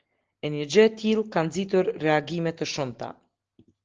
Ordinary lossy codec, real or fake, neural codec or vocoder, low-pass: Opus, 16 kbps; real; none; 7.2 kHz